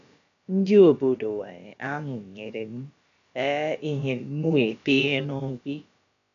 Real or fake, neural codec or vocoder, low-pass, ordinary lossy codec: fake; codec, 16 kHz, about 1 kbps, DyCAST, with the encoder's durations; 7.2 kHz; none